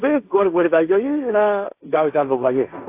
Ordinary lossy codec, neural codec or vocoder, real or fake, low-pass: AAC, 24 kbps; codec, 16 kHz, 1.1 kbps, Voila-Tokenizer; fake; 3.6 kHz